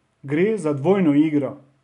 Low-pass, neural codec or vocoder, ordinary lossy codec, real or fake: 10.8 kHz; none; none; real